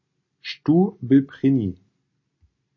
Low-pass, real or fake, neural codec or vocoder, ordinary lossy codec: 7.2 kHz; real; none; MP3, 32 kbps